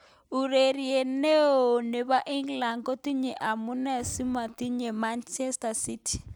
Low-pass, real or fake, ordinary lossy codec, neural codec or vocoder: none; real; none; none